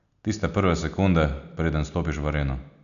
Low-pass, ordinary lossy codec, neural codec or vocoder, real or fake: 7.2 kHz; none; none; real